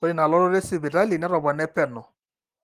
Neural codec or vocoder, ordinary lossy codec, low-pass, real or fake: none; Opus, 24 kbps; 14.4 kHz; real